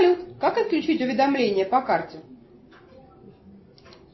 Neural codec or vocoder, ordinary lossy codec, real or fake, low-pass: none; MP3, 24 kbps; real; 7.2 kHz